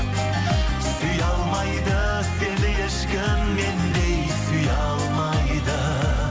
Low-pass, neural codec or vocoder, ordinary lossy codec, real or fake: none; none; none; real